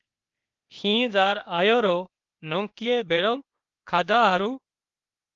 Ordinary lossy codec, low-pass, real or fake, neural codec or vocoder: Opus, 32 kbps; 7.2 kHz; fake; codec, 16 kHz, 0.8 kbps, ZipCodec